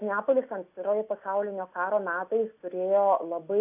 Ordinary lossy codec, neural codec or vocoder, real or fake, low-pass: AAC, 32 kbps; none; real; 3.6 kHz